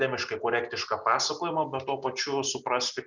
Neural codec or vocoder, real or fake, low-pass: none; real; 7.2 kHz